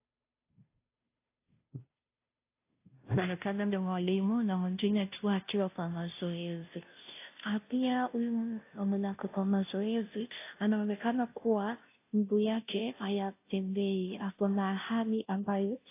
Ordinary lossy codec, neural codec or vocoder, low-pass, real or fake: AAC, 24 kbps; codec, 16 kHz, 0.5 kbps, FunCodec, trained on Chinese and English, 25 frames a second; 3.6 kHz; fake